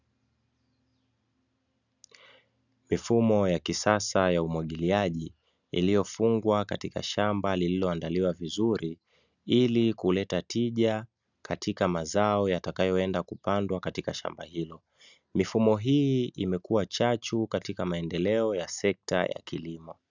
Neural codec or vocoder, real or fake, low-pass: none; real; 7.2 kHz